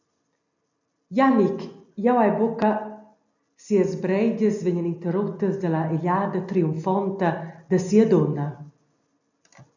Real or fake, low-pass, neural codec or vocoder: real; 7.2 kHz; none